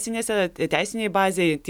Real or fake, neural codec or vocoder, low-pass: real; none; 19.8 kHz